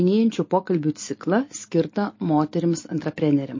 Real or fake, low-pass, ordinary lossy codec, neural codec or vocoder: real; 7.2 kHz; MP3, 32 kbps; none